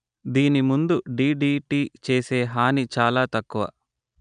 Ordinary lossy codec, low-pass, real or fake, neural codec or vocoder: none; 9.9 kHz; real; none